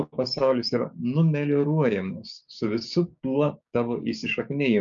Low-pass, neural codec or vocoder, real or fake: 7.2 kHz; codec, 16 kHz, 6 kbps, DAC; fake